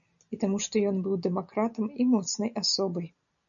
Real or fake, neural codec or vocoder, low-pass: real; none; 7.2 kHz